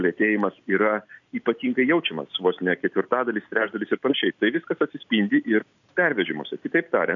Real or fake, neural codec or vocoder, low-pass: real; none; 7.2 kHz